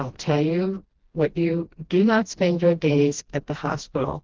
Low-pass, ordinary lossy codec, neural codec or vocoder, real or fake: 7.2 kHz; Opus, 16 kbps; codec, 16 kHz, 1 kbps, FreqCodec, smaller model; fake